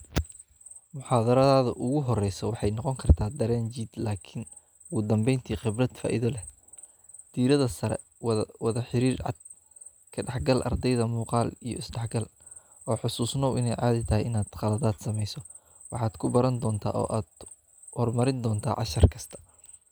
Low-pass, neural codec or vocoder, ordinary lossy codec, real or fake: none; none; none; real